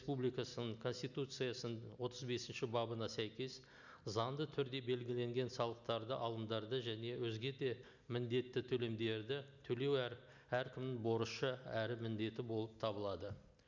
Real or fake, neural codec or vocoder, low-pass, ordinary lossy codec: real; none; 7.2 kHz; none